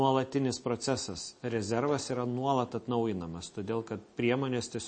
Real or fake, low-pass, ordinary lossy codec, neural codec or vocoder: real; 9.9 kHz; MP3, 32 kbps; none